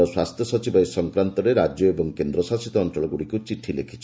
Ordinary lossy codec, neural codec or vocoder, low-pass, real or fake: none; none; none; real